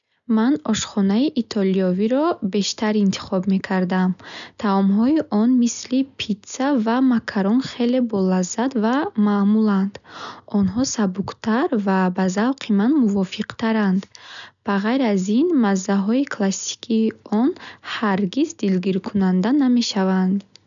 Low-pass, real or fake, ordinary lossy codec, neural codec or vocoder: 7.2 kHz; real; none; none